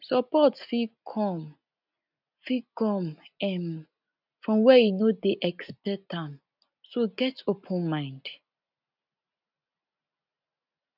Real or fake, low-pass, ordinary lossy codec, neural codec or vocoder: real; 5.4 kHz; none; none